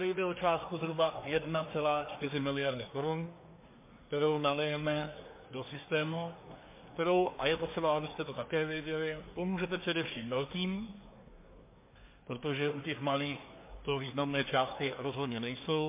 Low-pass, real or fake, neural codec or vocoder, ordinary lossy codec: 3.6 kHz; fake; codec, 24 kHz, 1 kbps, SNAC; MP3, 24 kbps